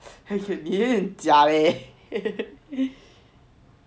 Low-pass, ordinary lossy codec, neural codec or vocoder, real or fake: none; none; none; real